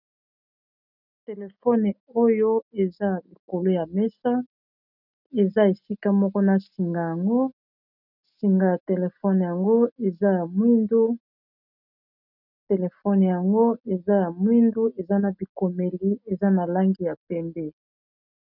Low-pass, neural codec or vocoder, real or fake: 5.4 kHz; none; real